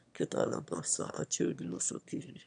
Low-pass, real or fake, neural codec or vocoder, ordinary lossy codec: 9.9 kHz; fake; autoencoder, 22.05 kHz, a latent of 192 numbers a frame, VITS, trained on one speaker; none